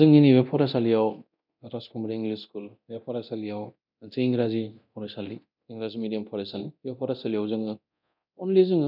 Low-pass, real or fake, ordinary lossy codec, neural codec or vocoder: 5.4 kHz; fake; none; codec, 24 kHz, 0.9 kbps, DualCodec